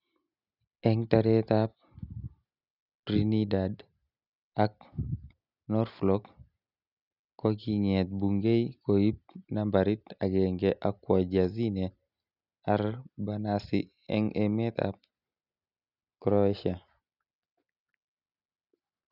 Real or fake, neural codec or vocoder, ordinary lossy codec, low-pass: real; none; none; 5.4 kHz